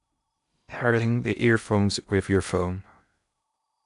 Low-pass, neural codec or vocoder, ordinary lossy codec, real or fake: 10.8 kHz; codec, 16 kHz in and 24 kHz out, 0.6 kbps, FocalCodec, streaming, 2048 codes; none; fake